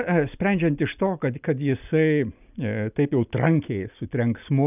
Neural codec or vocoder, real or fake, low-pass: none; real; 3.6 kHz